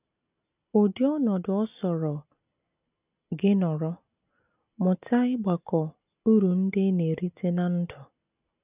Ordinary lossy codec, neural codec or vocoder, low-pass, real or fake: none; none; 3.6 kHz; real